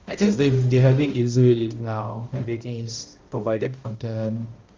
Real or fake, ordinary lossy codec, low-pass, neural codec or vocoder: fake; Opus, 32 kbps; 7.2 kHz; codec, 16 kHz, 0.5 kbps, X-Codec, HuBERT features, trained on balanced general audio